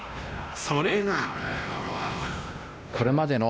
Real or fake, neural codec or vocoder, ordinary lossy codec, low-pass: fake; codec, 16 kHz, 1 kbps, X-Codec, WavLM features, trained on Multilingual LibriSpeech; none; none